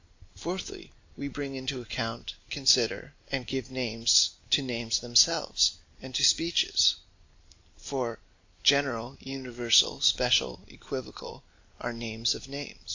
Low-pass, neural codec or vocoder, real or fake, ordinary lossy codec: 7.2 kHz; none; real; AAC, 48 kbps